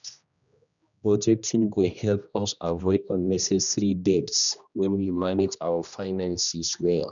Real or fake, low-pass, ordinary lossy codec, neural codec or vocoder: fake; 7.2 kHz; none; codec, 16 kHz, 1 kbps, X-Codec, HuBERT features, trained on general audio